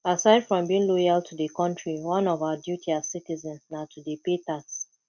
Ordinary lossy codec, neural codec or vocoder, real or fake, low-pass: none; none; real; 7.2 kHz